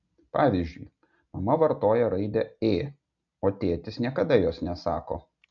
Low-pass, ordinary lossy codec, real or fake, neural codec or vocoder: 7.2 kHz; MP3, 96 kbps; real; none